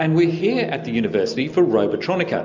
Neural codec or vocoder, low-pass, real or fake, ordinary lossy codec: none; 7.2 kHz; real; MP3, 64 kbps